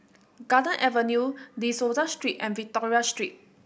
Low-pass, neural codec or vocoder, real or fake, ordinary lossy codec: none; none; real; none